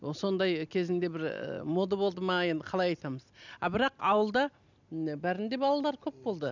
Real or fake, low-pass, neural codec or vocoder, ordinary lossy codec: real; 7.2 kHz; none; none